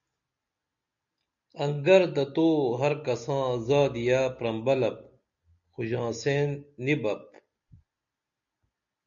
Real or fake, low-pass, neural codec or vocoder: real; 7.2 kHz; none